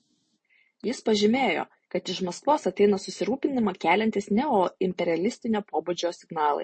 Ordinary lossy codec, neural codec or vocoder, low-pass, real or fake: MP3, 32 kbps; none; 9.9 kHz; real